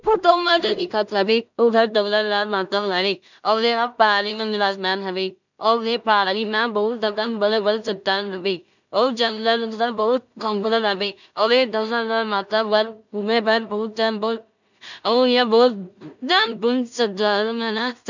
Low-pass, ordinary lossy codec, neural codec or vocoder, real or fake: 7.2 kHz; none; codec, 16 kHz in and 24 kHz out, 0.4 kbps, LongCat-Audio-Codec, two codebook decoder; fake